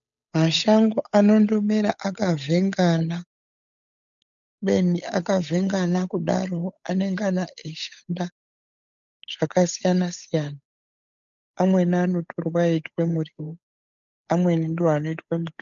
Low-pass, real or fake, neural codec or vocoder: 7.2 kHz; fake; codec, 16 kHz, 8 kbps, FunCodec, trained on Chinese and English, 25 frames a second